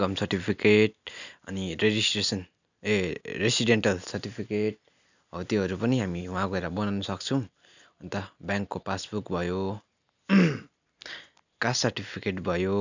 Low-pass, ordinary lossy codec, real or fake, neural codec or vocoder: 7.2 kHz; none; real; none